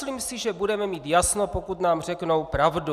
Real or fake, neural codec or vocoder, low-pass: real; none; 14.4 kHz